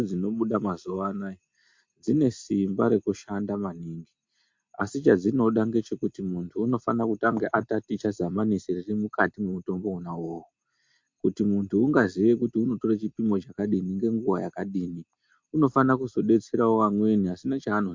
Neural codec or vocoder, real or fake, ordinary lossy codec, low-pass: none; real; MP3, 48 kbps; 7.2 kHz